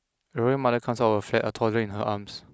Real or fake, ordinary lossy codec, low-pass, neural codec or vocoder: real; none; none; none